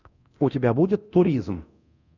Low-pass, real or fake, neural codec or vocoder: 7.2 kHz; fake; codec, 24 kHz, 0.9 kbps, DualCodec